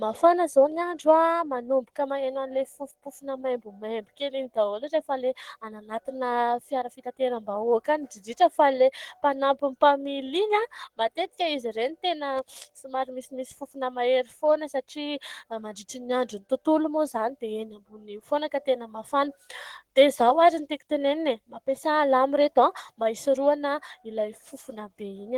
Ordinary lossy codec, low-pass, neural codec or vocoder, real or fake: Opus, 16 kbps; 19.8 kHz; codec, 44.1 kHz, 7.8 kbps, Pupu-Codec; fake